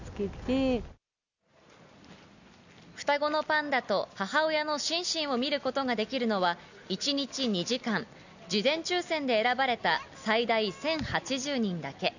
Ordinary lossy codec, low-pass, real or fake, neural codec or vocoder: none; 7.2 kHz; real; none